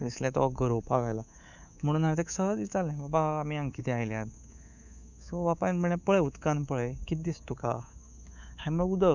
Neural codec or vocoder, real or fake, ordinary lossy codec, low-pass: codec, 24 kHz, 3.1 kbps, DualCodec; fake; none; 7.2 kHz